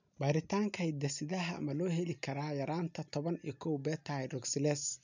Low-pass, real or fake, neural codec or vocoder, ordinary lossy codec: 7.2 kHz; real; none; none